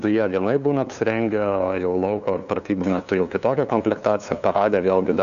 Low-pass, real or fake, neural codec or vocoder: 7.2 kHz; fake; codec, 16 kHz, 2 kbps, FunCodec, trained on LibriTTS, 25 frames a second